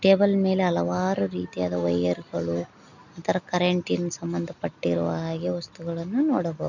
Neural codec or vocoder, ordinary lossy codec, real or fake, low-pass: none; none; real; 7.2 kHz